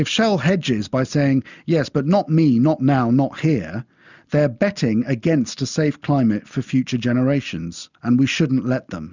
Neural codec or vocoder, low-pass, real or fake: none; 7.2 kHz; real